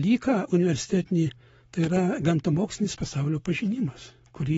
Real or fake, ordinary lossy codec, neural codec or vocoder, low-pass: fake; AAC, 24 kbps; codec, 44.1 kHz, 7.8 kbps, Pupu-Codec; 19.8 kHz